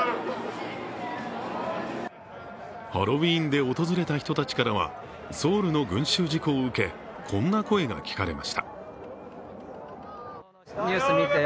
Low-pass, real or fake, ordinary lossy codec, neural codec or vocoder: none; real; none; none